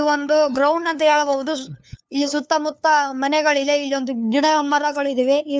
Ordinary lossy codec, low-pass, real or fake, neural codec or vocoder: none; none; fake; codec, 16 kHz, 2 kbps, FunCodec, trained on LibriTTS, 25 frames a second